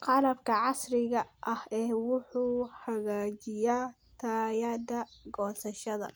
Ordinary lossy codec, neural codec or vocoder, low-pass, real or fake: none; none; none; real